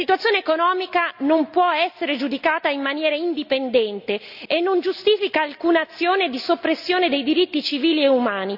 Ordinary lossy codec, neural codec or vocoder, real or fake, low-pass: none; none; real; 5.4 kHz